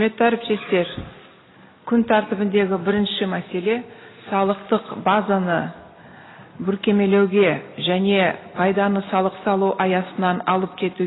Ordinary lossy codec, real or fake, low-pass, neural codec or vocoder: AAC, 16 kbps; real; 7.2 kHz; none